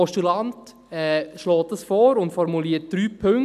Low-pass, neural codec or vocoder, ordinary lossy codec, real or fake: 14.4 kHz; none; none; real